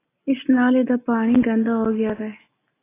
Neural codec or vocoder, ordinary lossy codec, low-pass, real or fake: none; AAC, 16 kbps; 3.6 kHz; real